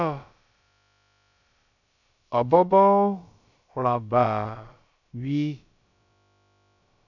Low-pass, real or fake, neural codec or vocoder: 7.2 kHz; fake; codec, 16 kHz, about 1 kbps, DyCAST, with the encoder's durations